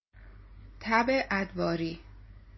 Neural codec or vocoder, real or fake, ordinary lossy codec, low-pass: none; real; MP3, 24 kbps; 7.2 kHz